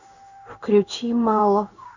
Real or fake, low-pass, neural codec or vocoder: fake; 7.2 kHz; codec, 16 kHz in and 24 kHz out, 1 kbps, XY-Tokenizer